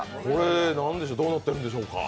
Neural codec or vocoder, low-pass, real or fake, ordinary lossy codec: none; none; real; none